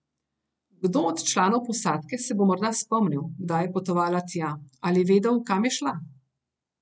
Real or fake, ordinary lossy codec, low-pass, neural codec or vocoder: real; none; none; none